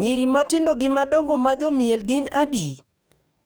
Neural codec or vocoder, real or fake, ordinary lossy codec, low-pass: codec, 44.1 kHz, 2.6 kbps, DAC; fake; none; none